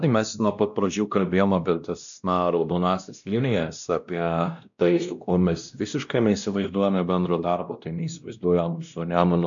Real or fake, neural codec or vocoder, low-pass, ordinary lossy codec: fake; codec, 16 kHz, 1 kbps, X-Codec, WavLM features, trained on Multilingual LibriSpeech; 7.2 kHz; MP3, 96 kbps